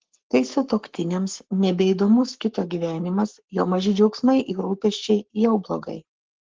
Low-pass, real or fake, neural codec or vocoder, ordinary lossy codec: 7.2 kHz; fake; codec, 44.1 kHz, 7.8 kbps, Pupu-Codec; Opus, 16 kbps